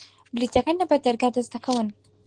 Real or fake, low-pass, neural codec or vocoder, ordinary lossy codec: real; 9.9 kHz; none; Opus, 16 kbps